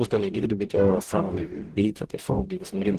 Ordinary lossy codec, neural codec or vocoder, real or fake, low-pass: Opus, 16 kbps; codec, 44.1 kHz, 0.9 kbps, DAC; fake; 14.4 kHz